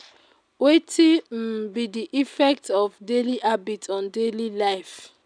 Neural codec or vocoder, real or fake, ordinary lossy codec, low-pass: none; real; none; 9.9 kHz